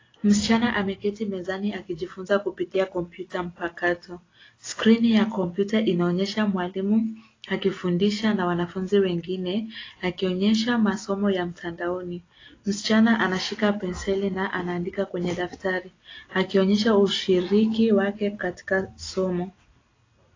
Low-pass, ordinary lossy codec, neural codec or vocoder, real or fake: 7.2 kHz; AAC, 32 kbps; none; real